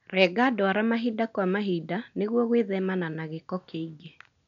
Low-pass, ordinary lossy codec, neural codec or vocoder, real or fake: 7.2 kHz; none; none; real